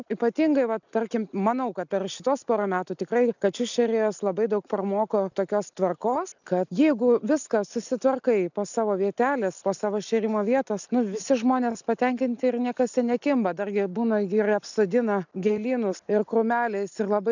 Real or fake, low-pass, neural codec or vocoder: real; 7.2 kHz; none